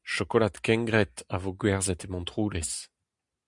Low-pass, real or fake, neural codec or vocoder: 10.8 kHz; real; none